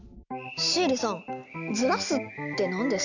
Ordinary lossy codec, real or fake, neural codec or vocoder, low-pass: none; real; none; 7.2 kHz